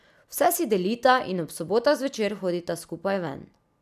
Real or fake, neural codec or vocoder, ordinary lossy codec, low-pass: real; none; none; 14.4 kHz